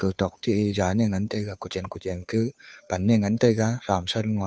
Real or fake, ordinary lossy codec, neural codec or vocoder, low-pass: fake; none; codec, 16 kHz, 2 kbps, FunCodec, trained on Chinese and English, 25 frames a second; none